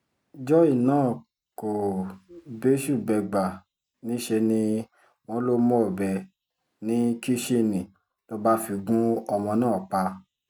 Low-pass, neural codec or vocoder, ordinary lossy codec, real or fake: 19.8 kHz; none; none; real